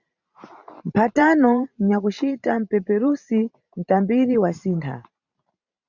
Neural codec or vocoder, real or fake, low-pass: vocoder, 44.1 kHz, 128 mel bands every 512 samples, BigVGAN v2; fake; 7.2 kHz